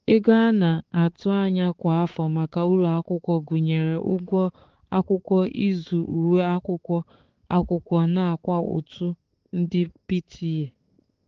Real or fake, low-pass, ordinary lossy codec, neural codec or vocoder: fake; 7.2 kHz; Opus, 16 kbps; codec, 16 kHz, 4 kbps, X-Codec, HuBERT features, trained on balanced general audio